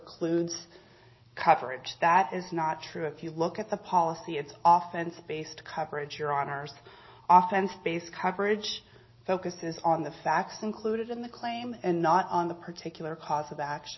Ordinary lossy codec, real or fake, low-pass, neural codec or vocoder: MP3, 24 kbps; real; 7.2 kHz; none